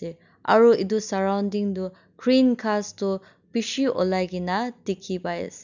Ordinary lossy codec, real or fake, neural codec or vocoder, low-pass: none; real; none; 7.2 kHz